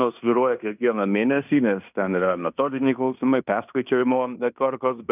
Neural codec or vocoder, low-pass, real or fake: codec, 16 kHz in and 24 kHz out, 0.9 kbps, LongCat-Audio-Codec, fine tuned four codebook decoder; 3.6 kHz; fake